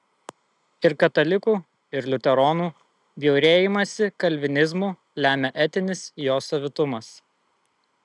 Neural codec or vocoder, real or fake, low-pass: none; real; 10.8 kHz